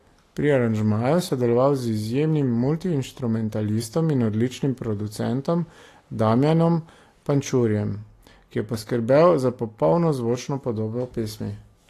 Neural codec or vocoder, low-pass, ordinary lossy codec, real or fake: none; 14.4 kHz; AAC, 48 kbps; real